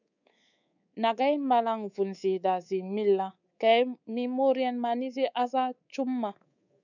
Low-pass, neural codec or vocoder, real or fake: 7.2 kHz; codec, 24 kHz, 3.1 kbps, DualCodec; fake